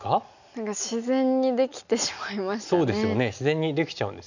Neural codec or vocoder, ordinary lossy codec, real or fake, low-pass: none; none; real; 7.2 kHz